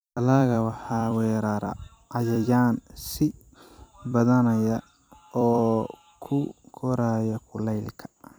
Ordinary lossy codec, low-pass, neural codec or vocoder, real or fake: none; none; vocoder, 44.1 kHz, 128 mel bands every 256 samples, BigVGAN v2; fake